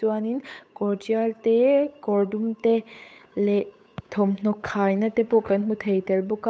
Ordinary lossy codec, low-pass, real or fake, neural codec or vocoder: none; none; fake; codec, 16 kHz, 8 kbps, FunCodec, trained on Chinese and English, 25 frames a second